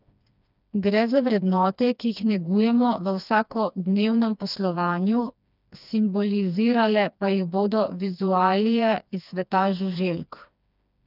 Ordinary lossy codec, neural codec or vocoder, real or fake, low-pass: none; codec, 16 kHz, 2 kbps, FreqCodec, smaller model; fake; 5.4 kHz